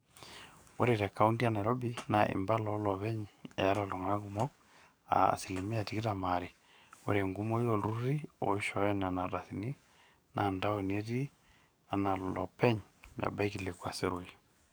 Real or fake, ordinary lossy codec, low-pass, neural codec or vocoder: fake; none; none; codec, 44.1 kHz, 7.8 kbps, DAC